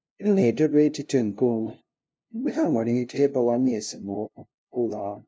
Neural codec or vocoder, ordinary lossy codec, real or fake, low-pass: codec, 16 kHz, 0.5 kbps, FunCodec, trained on LibriTTS, 25 frames a second; none; fake; none